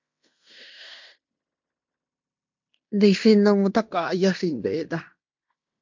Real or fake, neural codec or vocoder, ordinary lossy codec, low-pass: fake; codec, 16 kHz in and 24 kHz out, 0.9 kbps, LongCat-Audio-Codec, fine tuned four codebook decoder; MP3, 64 kbps; 7.2 kHz